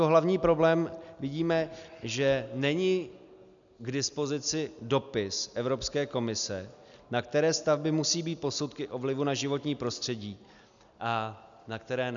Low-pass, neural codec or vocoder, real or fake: 7.2 kHz; none; real